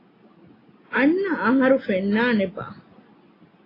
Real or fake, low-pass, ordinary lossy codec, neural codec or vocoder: real; 5.4 kHz; AAC, 24 kbps; none